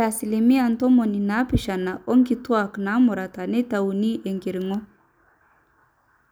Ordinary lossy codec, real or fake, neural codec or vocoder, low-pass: none; real; none; none